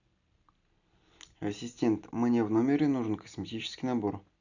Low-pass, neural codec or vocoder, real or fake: 7.2 kHz; none; real